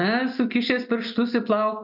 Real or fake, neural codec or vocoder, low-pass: real; none; 5.4 kHz